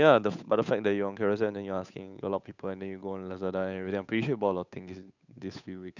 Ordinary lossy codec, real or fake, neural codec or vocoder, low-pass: none; fake; codec, 16 kHz, 8 kbps, FunCodec, trained on Chinese and English, 25 frames a second; 7.2 kHz